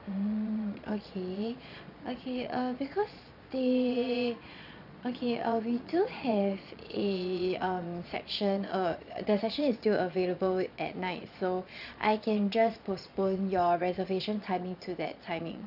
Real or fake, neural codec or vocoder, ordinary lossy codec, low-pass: fake; vocoder, 22.05 kHz, 80 mel bands, Vocos; none; 5.4 kHz